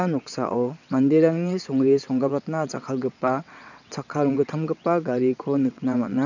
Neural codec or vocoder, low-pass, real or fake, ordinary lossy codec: vocoder, 22.05 kHz, 80 mel bands, WaveNeXt; 7.2 kHz; fake; none